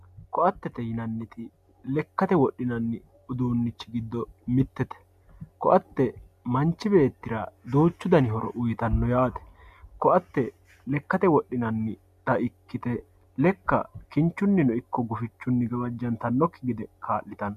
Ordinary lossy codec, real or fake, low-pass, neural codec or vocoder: MP3, 96 kbps; real; 14.4 kHz; none